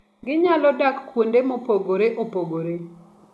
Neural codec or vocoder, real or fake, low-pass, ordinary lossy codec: none; real; none; none